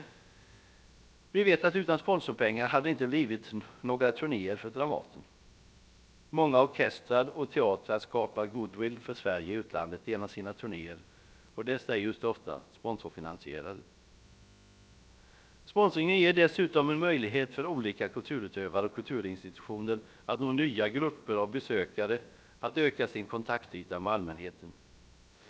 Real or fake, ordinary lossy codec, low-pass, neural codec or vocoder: fake; none; none; codec, 16 kHz, about 1 kbps, DyCAST, with the encoder's durations